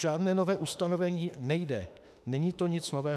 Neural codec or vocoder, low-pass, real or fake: autoencoder, 48 kHz, 32 numbers a frame, DAC-VAE, trained on Japanese speech; 14.4 kHz; fake